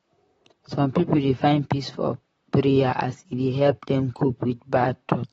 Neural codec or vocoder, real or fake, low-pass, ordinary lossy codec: vocoder, 44.1 kHz, 128 mel bands, Pupu-Vocoder; fake; 19.8 kHz; AAC, 24 kbps